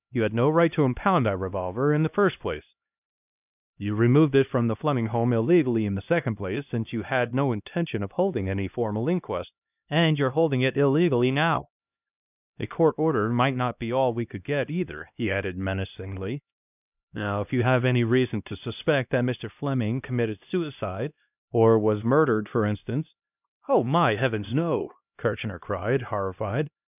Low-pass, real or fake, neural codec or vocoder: 3.6 kHz; fake; codec, 16 kHz, 1 kbps, X-Codec, HuBERT features, trained on LibriSpeech